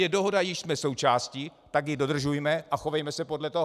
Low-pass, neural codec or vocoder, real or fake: 14.4 kHz; none; real